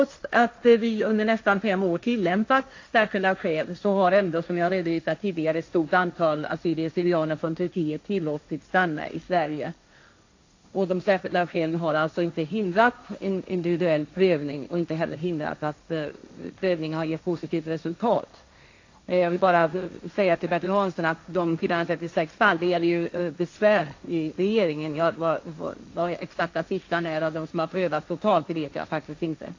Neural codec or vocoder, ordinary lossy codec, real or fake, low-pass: codec, 16 kHz, 1.1 kbps, Voila-Tokenizer; AAC, 48 kbps; fake; 7.2 kHz